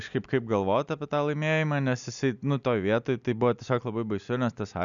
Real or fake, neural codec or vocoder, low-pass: real; none; 7.2 kHz